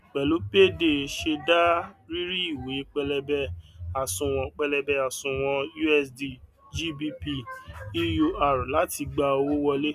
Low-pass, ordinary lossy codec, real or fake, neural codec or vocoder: 14.4 kHz; none; real; none